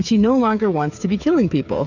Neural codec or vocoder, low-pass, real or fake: codec, 16 kHz, 8 kbps, FreqCodec, smaller model; 7.2 kHz; fake